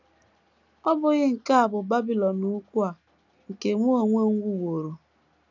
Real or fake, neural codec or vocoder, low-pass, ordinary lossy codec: real; none; 7.2 kHz; none